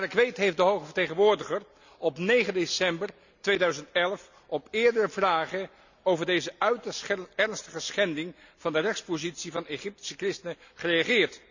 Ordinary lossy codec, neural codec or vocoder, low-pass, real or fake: none; none; 7.2 kHz; real